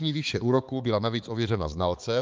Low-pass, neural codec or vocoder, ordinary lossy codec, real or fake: 7.2 kHz; codec, 16 kHz, 4 kbps, X-Codec, HuBERT features, trained on balanced general audio; Opus, 24 kbps; fake